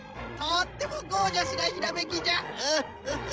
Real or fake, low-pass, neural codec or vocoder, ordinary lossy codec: fake; none; codec, 16 kHz, 16 kbps, FreqCodec, larger model; none